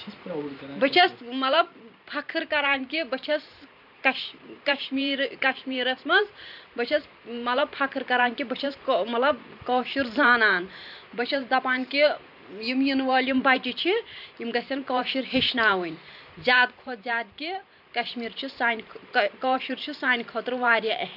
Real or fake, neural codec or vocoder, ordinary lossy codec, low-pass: fake; vocoder, 44.1 kHz, 128 mel bands every 512 samples, BigVGAN v2; none; 5.4 kHz